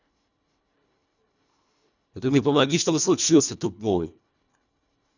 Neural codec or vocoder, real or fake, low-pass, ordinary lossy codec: codec, 24 kHz, 1.5 kbps, HILCodec; fake; 7.2 kHz; none